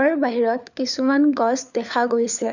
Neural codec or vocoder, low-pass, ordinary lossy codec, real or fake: codec, 16 kHz, 4 kbps, FunCodec, trained on LibriTTS, 50 frames a second; 7.2 kHz; none; fake